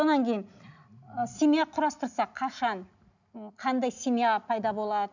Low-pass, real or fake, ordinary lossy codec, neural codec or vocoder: 7.2 kHz; real; none; none